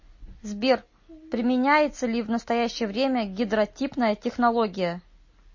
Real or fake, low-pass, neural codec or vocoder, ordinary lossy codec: real; 7.2 kHz; none; MP3, 32 kbps